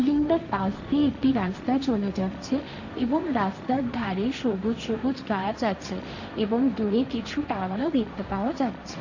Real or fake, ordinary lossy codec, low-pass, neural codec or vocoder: fake; none; 7.2 kHz; codec, 16 kHz, 1.1 kbps, Voila-Tokenizer